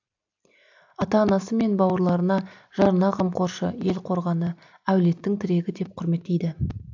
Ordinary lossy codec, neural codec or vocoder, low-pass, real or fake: AAC, 48 kbps; none; 7.2 kHz; real